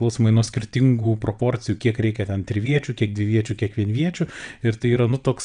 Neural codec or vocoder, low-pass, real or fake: vocoder, 22.05 kHz, 80 mel bands, WaveNeXt; 9.9 kHz; fake